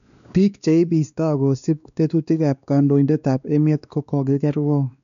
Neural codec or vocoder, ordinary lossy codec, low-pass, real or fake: codec, 16 kHz, 2 kbps, X-Codec, WavLM features, trained on Multilingual LibriSpeech; none; 7.2 kHz; fake